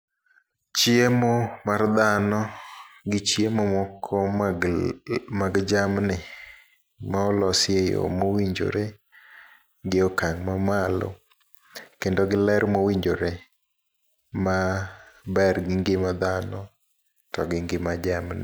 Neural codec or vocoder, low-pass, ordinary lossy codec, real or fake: none; none; none; real